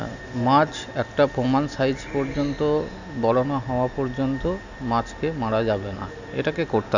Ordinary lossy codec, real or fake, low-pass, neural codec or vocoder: none; real; 7.2 kHz; none